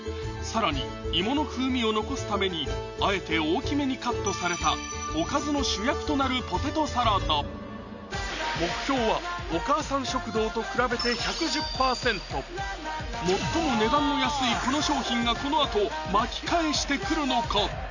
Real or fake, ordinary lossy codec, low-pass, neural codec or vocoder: real; none; 7.2 kHz; none